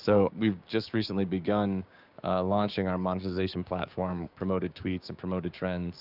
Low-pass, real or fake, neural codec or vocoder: 5.4 kHz; fake; codec, 16 kHz, 6 kbps, DAC